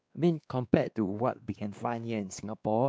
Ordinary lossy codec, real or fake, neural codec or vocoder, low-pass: none; fake; codec, 16 kHz, 2 kbps, X-Codec, WavLM features, trained on Multilingual LibriSpeech; none